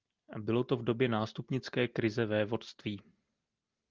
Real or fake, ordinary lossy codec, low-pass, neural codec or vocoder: real; Opus, 32 kbps; 7.2 kHz; none